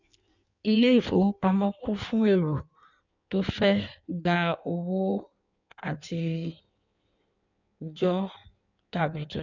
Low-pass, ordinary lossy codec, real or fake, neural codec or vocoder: 7.2 kHz; none; fake; codec, 16 kHz in and 24 kHz out, 1.1 kbps, FireRedTTS-2 codec